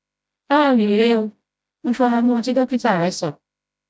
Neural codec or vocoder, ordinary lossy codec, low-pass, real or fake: codec, 16 kHz, 0.5 kbps, FreqCodec, smaller model; none; none; fake